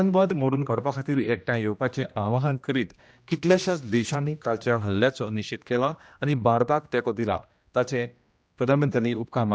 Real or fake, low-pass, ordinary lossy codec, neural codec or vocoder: fake; none; none; codec, 16 kHz, 1 kbps, X-Codec, HuBERT features, trained on general audio